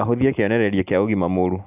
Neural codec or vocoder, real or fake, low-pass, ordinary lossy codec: none; real; 3.6 kHz; none